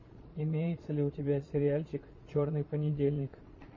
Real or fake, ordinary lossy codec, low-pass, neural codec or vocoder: fake; MP3, 32 kbps; 7.2 kHz; vocoder, 22.05 kHz, 80 mel bands, Vocos